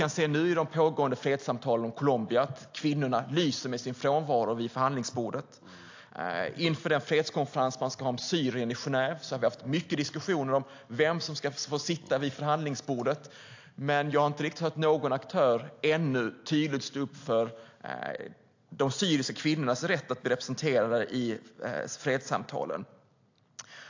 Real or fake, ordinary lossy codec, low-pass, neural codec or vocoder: real; AAC, 48 kbps; 7.2 kHz; none